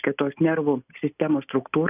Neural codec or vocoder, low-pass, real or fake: none; 3.6 kHz; real